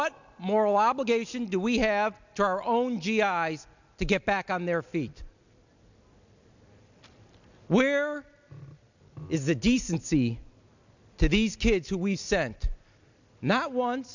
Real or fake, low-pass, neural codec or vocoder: real; 7.2 kHz; none